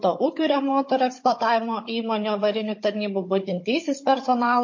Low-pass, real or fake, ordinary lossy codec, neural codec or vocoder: 7.2 kHz; fake; MP3, 32 kbps; codec, 16 kHz, 16 kbps, FunCodec, trained on Chinese and English, 50 frames a second